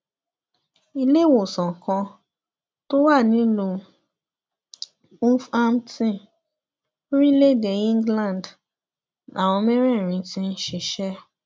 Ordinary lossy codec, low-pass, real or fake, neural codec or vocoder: none; none; real; none